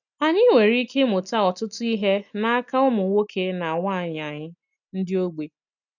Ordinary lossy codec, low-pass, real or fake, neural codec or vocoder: none; 7.2 kHz; real; none